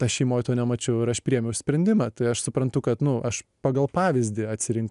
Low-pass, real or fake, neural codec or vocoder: 10.8 kHz; real; none